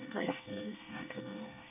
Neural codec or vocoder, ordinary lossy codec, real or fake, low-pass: codec, 24 kHz, 1 kbps, SNAC; none; fake; 3.6 kHz